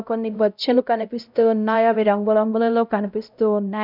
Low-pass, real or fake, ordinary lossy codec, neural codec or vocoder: 5.4 kHz; fake; none; codec, 16 kHz, 0.5 kbps, X-Codec, HuBERT features, trained on LibriSpeech